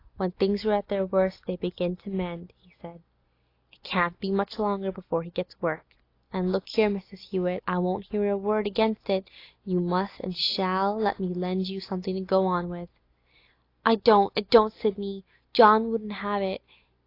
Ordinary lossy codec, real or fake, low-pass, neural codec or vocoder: AAC, 32 kbps; real; 5.4 kHz; none